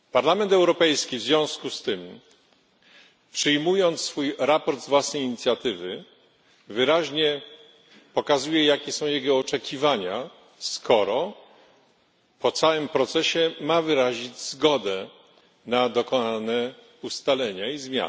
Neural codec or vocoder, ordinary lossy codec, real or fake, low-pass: none; none; real; none